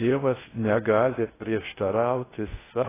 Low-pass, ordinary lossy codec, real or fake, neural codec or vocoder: 3.6 kHz; AAC, 16 kbps; fake; codec, 16 kHz in and 24 kHz out, 0.6 kbps, FocalCodec, streaming, 2048 codes